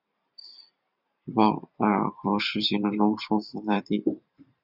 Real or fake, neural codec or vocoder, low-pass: fake; vocoder, 44.1 kHz, 128 mel bands every 512 samples, BigVGAN v2; 5.4 kHz